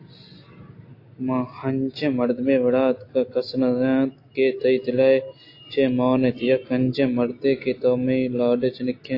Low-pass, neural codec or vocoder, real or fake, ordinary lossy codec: 5.4 kHz; none; real; AAC, 32 kbps